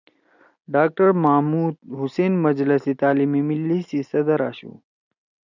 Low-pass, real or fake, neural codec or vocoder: 7.2 kHz; real; none